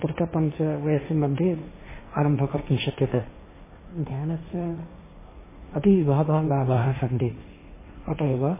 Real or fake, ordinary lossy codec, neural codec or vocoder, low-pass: fake; MP3, 16 kbps; codec, 16 kHz, 1.1 kbps, Voila-Tokenizer; 3.6 kHz